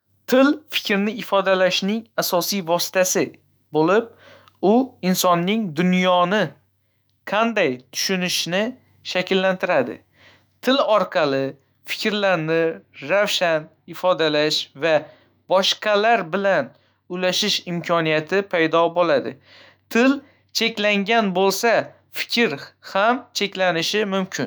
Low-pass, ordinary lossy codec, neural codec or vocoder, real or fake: none; none; autoencoder, 48 kHz, 128 numbers a frame, DAC-VAE, trained on Japanese speech; fake